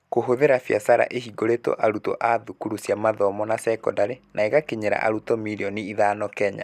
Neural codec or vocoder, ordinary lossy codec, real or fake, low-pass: none; none; real; 14.4 kHz